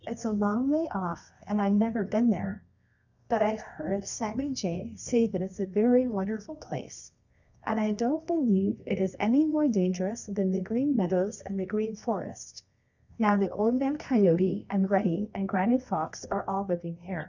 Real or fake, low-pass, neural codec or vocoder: fake; 7.2 kHz; codec, 24 kHz, 0.9 kbps, WavTokenizer, medium music audio release